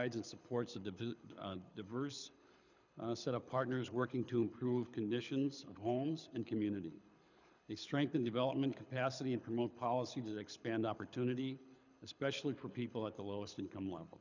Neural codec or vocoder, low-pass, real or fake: codec, 24 kHz, 6 kbps, HILCodec; 7.2 kHz; fake